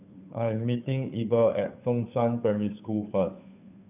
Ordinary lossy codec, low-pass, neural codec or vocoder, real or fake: none; 3.6 kHz; codec, 16 kHz, 2 kbps, FunCodec, trained on Chinese and English, 25 frames a second; fake